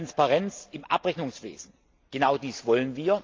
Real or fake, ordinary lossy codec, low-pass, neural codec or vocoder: real; Opus, 24 kbps; 7.2 kHz; none